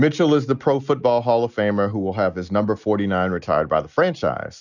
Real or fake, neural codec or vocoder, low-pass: real; none; 7.2 kHz